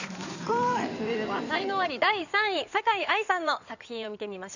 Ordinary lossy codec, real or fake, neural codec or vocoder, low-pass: none; fake; codec, 16 kHz in and 24 kHz out, 2.2 kbps, FireRedTTS-2 codec; 7.2 kHz